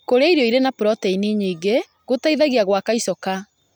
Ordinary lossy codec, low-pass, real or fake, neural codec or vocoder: none; none; real; none